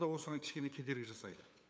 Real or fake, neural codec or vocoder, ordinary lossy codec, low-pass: fake; codec, 16 kHz, 8 kbps, FunCodec, trained on LibriTTS, 25 frames a second; none; none